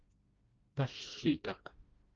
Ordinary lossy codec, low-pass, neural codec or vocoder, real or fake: Opus, 24 kbps; 7.2 kHz; codec, 16 kHz, 1 kbps, FreqCodec, smaller model; fake